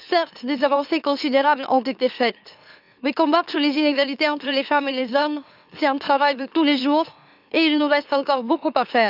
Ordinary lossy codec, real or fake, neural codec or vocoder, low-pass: none; fake; autoencoder, 44.1 kHz, a latent of 192 numbers a frame, MeloTTS; 5.4 kHz